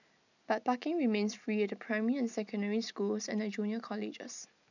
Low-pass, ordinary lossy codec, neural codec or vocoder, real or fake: 7.2 kHz; none; none; real